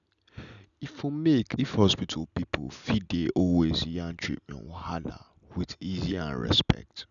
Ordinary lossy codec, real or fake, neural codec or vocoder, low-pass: none; real; none; 7.2 kHz